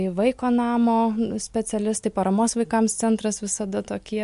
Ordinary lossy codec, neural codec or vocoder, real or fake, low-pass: MP3, 64 kbps; none; real; 10.8 kHz